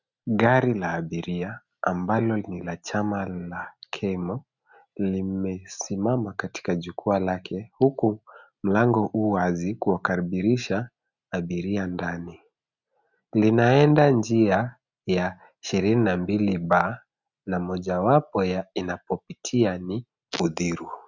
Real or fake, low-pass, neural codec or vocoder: real; 7.2 kHz; none